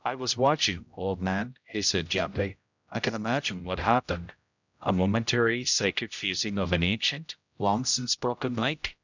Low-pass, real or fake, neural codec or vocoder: 7.2 kHz; fake; codec, 16 kHz, 0.5 kbps, X-Codec, HuBERT features, trained on general audio